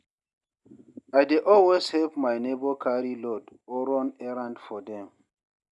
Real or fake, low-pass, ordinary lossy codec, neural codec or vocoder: real; 10.8 kHz; none; none